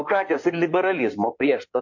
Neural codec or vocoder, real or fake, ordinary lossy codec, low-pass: codec, 16 kHz in and 24 kHz out, 2.2 kbps, FireRedTTS-2 codec; fake; MP3, 48 kbps; 7.2 kHz